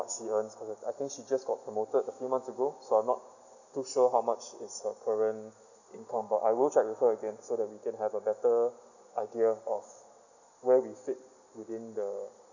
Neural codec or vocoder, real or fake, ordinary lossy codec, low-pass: none; real; none; 7.2 kHz